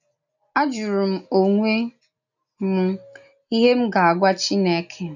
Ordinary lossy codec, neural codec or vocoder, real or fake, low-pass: none; none; real; 7.2 kHz